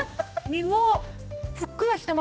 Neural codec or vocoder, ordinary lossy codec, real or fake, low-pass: codec, 16 kHz, 1 kbps, X-Codec, HuBERT features, trained on general audio; none; fake; none